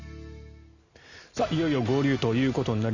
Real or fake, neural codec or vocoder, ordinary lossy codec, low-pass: real; none; Opus, 64 kbps; 7.2 kHz